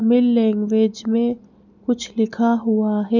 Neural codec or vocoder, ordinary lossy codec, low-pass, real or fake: none; none; 7.2 kHz; real